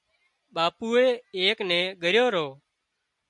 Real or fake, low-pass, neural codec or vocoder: real; 10.8 kHz; none